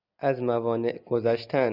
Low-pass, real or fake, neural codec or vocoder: 5.4 kHz; real; none